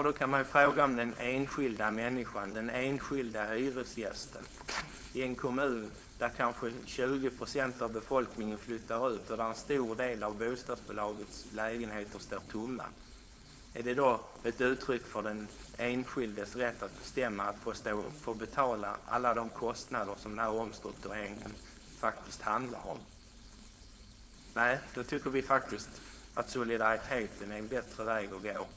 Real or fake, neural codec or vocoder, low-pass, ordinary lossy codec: fake; codec, 16 kHz, 4.8 kbps, FACodec; none; none